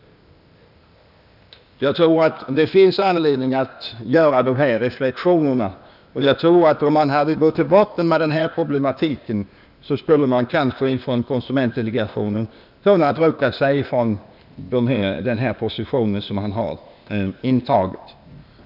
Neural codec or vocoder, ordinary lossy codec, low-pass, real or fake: codec, 16 kHz, 0.8 kbps, ZipCodec; none; 5.4 kHz; fake